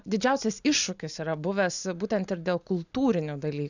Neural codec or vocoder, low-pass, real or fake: none; 7.2 kHz; real